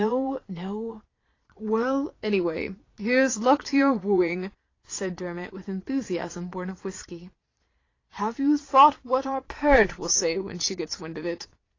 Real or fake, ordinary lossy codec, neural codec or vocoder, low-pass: fake; AAC, 32 kbps; vocoder, 22.05 kHz, 80 mel bands, Vocos; 7.2 kHz